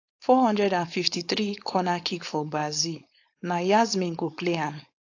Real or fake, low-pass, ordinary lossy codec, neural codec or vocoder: fake; 7.2 kHz; AAC, 48 kbps; codec, 16 kHz, 4.8 kbps, FACodec